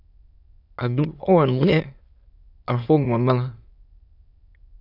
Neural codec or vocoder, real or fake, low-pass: autoencoder, 22.05 kHz, a latent of 192 numbers a frame, VITS, trained on many speakers; fake; 5.4 kHz